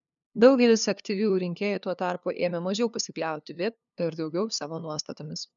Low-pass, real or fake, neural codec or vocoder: 7.2 kHz; fake; codec, 16 kHz, 2 kbps, FunCodec, trained on LibriTTS, 25 frames a second